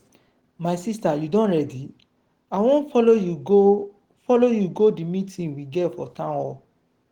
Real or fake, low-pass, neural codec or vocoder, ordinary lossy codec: real; 19.8 kHz; none; Opus, 16 kbps